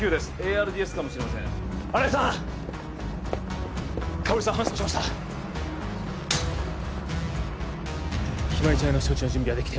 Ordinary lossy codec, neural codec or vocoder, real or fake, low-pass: none; none; real; none